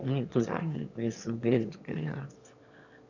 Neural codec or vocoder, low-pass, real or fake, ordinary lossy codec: autoencoder, 22.05 kHz, a latent of 192 numbers a frame, VITS, trained on one speaker; 7.2 kHz; fake; none